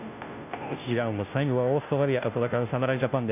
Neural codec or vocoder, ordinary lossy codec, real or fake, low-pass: codec, 16 kHz, 0.5 kbps, FunCodec, trained on Chinese and English, 25 frames a second; none; fake; 3.6 kHz